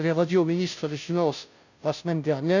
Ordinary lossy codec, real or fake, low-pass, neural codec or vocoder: none; fake; 7.2 kHz; codec, 16 kHz, 0.5 kbps, FunCodec, trained on Chinese and English, 25 frames a second